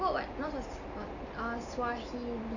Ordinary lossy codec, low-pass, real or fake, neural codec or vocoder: none; 7.2 kHz; real; none